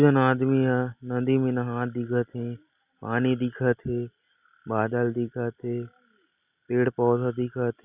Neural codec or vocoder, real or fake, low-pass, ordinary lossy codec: none; real; 3.6 kHz; Opus, 64 kbps